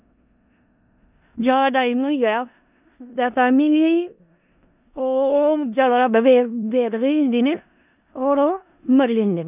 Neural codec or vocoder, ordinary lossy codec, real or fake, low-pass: codec, 16 kHz in and 24 kHz out, 0.4 kbps, LongCat-Audio-Codec, four codebook decoder; none; fake; 3.6 kHz